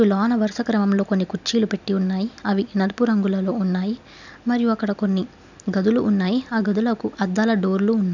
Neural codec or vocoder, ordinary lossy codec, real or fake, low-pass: none; none; real; 7.2 kHz